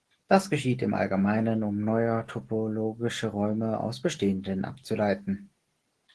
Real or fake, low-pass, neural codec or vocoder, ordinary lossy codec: real; 10.8 kHz; none; Opus, 16 kbps